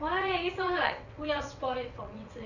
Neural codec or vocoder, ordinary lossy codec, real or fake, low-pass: vocoder, 22.05 kHz, 80 mel bands, WaveNeXt; none; fake; 7.2 kHz